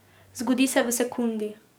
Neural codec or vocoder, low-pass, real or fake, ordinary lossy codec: codec, 44.1 kHz, 7.8 kbps, DAC; none; fake; none